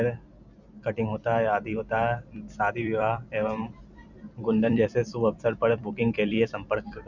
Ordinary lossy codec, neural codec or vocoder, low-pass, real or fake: none; vocoder, 44.1 kHz, 128 mel bands every 256 samples, BigVGAN v2; 7.2 kHz; fake